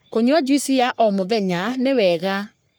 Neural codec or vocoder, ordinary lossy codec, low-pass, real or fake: codec, 44.1 kHz, 3.4 kbps, Pupu-Codec; none; none; fake